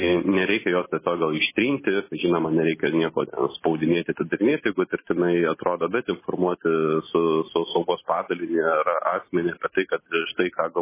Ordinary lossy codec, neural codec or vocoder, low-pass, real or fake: MP3, 16 kbps; none; 3.6 kHz; real